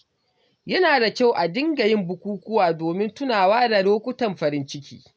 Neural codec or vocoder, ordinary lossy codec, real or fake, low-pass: none; none; real; none